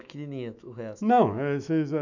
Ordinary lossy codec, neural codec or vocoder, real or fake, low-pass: none; autoencoder, 48 kHz, 128 numbers a frame, DAC-VAE, trained on Japanese speech; fake; 7.2 kHz